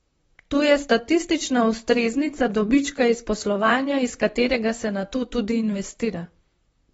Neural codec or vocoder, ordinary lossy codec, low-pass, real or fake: vocoder, 44.1 kHz, 128 mel bands, Pupu-Vocoder; AAC, 24 kbps; 19.8 kHz; fake